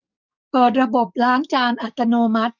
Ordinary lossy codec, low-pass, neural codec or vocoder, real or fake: none; 7.2 kHz; codec, 44.1 kHz, 7.8 kbps, DAC; fake